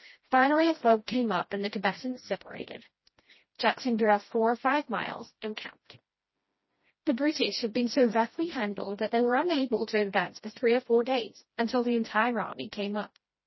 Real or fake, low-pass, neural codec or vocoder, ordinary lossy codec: fake; 7.2 kHz; codec, 16 kHz, 1 kbps, FreqCodec, smaller model; MP3, 24 kbps